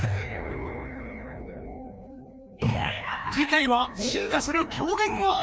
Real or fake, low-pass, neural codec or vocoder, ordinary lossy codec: fake; none; codec, 16 kHz, 1 kbps, FreqCodec, larger model; none